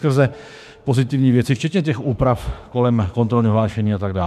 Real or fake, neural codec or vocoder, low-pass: fake; autoencoder, 48 kHz, 32 numbers a frame, DAC-VAE, trained on Japanese speech; 14.4 kHz